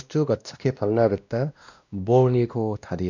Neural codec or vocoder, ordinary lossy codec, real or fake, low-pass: codec, 16 kHz, 1 kbps, X-Codec, WavLM features, trained on Multilingual LibriSpeech; none; fake; 7.2 kHz